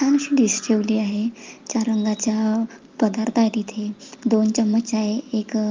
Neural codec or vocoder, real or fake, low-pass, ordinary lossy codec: none; real; 7.2 kHz; Opus, 32 kbps